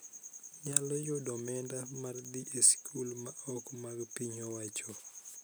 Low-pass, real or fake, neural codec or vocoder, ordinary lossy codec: none; real; none; none